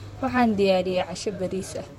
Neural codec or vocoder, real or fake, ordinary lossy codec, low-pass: vocoder, 44.1 kHz, 128 mel bands, Pupu-Vocoder; fake; MP3, 64 kbps; 19.8 kHz